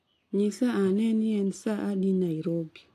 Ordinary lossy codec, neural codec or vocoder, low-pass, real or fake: AAC, 64 kbps; none; 14.4 kHz; real